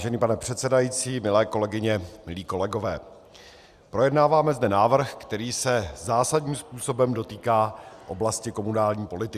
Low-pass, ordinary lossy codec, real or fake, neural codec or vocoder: 14.4 kHz; Opus, 64 kbps; real; none